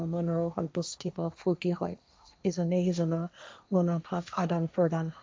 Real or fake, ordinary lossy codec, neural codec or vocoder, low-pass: fake; none; codec, 16 kHz, 1.1 kbps, Voila-Tokenizer; none